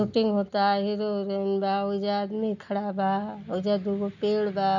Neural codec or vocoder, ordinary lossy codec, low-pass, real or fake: none; none; 7.2 kHz; real